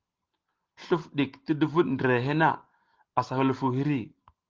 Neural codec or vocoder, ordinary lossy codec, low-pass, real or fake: none; Opus, 16 kbps; 7.2 kHz; real